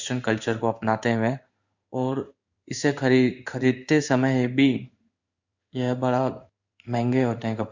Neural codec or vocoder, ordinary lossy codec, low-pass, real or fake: codec, 16 kHz in and 24 kHz out, 1 kbps, XY-Tokenizer; Opus, 64 kbps; 7.2 kHz; fake